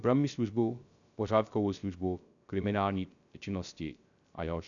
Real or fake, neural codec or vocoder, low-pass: fake; codec, 16 kHz, 0.3 kbps, FocalCodec; 7.2 kHz